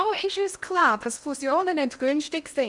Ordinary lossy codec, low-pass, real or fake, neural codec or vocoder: none; 10.8 kHz; fake; codec, 16 kHz in and 24 kHz out, 0.8 kbps, FocalCodec, streaming, 65536 codes